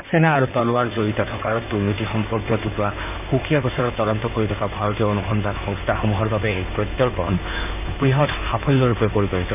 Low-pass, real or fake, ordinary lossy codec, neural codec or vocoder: 3.6 kHz; fake; none; codec, 16 kHz in and 24 kHz out, 2.2 kbps, FireRedTTS-2 codec